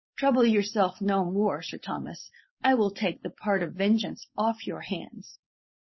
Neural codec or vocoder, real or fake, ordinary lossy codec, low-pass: codec, 16 kHz, 4.8 kbps, FACodec; fake; MP3, 24 kbps; 7.2 kHz